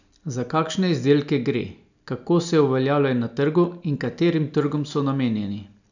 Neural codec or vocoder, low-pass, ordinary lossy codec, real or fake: none; 7.2 kHz; none; real